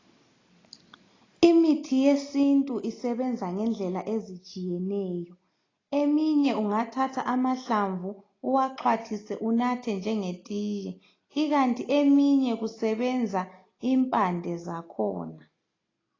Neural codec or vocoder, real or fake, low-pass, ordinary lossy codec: none; real; 7.2 kHz; AAC, 32 kbps